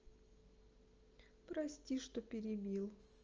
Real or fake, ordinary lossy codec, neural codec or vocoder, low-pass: real; Opus, 24 kbps; none; 7.2 kHz